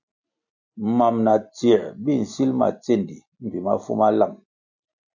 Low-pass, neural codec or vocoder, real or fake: 7.2 kHz; none; real